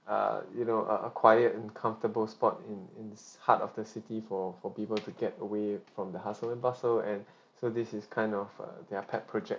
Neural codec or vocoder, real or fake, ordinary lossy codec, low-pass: none; real; none; none